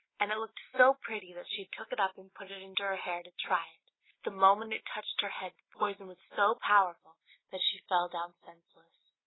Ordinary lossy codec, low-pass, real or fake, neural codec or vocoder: AAC, 16 kbps; 7.2 kHz; real; none